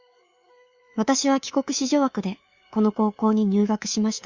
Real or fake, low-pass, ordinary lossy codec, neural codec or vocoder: fake; 7.2 kHz; Opus, 64 kbps; codec, 24 kHz, 3.1 kbps, DualCodec